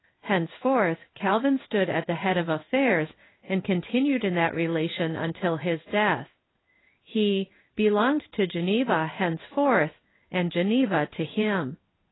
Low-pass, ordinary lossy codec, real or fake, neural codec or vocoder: 7.2 kHz; AAC, 16 kbps; fake; codec, 16 kHz in and 24 kHz out, 1 kbps, XY-Tokenizer